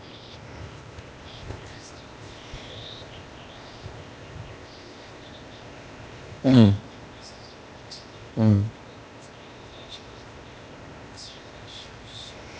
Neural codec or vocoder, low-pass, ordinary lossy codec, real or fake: codec, 16 kHz, 0.8 kbps, ZipCodec; none; none; fake